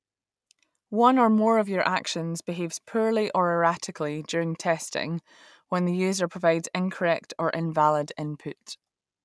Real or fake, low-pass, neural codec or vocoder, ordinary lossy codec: real; none; none; none